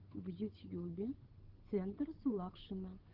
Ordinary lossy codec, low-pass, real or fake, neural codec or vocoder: Opus, 16 kbps; 5.4 kHz; fake; codec, 16 kHz, 8 kbps, FunCodec, trained on Chinese and English, 25 frames a second